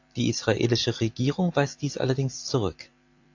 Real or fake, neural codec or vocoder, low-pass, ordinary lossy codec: fake; vocoder, 44.1 kHz, 128 mel bands every 256 samples, BigVGAN v2; 7.2 kHz; AAC, 48 kbps